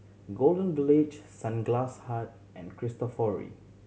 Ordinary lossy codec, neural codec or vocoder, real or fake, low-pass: none; none; real; none